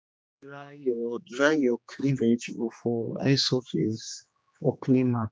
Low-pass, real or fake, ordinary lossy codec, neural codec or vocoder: none; fake; none; codec, 16 kHz, 2 kbps, X-Codec, HuBERT features, trained on general audio